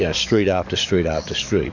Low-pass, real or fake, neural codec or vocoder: 7.2 kHz; fake; codec, 44.1 kHz, 7.8 kbps, DAC